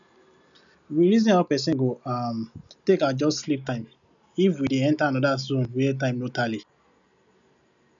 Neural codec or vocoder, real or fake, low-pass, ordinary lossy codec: none; real; 7.2 kHz; MP3, 96 kbps